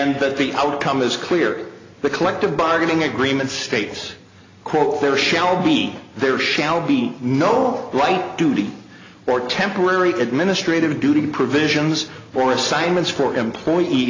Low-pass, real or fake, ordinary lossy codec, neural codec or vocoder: 7.2 kHz; real; AAC, 32 kbps; none